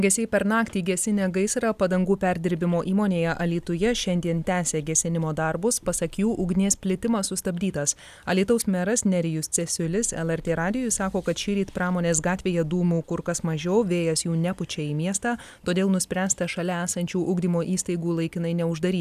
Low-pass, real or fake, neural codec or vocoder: 14.4 kHz; real; none